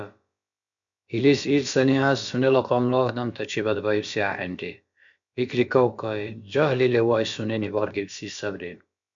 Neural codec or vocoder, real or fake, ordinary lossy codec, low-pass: codec, 16 kHz, about 1 kbps, DyCAST, with the encoder's durations; fake; MP3, 64 kbps; 7.2 kHz